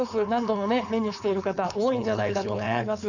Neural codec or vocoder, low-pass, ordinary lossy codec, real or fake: codec, 16 kHz, 4.8 kbps, FACodec; 7.2 kHz; none; fake